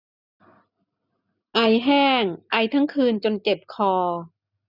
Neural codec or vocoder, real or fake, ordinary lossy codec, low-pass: none; real; none; 5.4 kHz